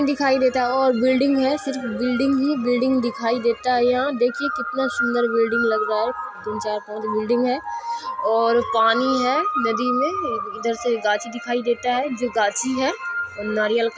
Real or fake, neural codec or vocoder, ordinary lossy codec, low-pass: real; none; none; none